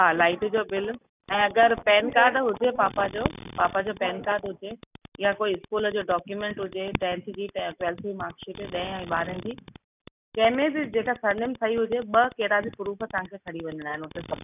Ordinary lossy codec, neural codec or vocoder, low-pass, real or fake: none; none; 3.6 kHz; real